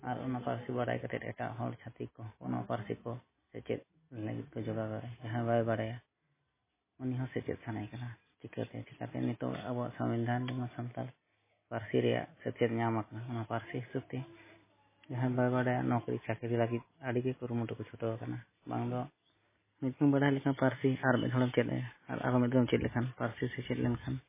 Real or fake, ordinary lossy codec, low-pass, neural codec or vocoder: real; MP3, 16 kbps; 3.6 kHz; none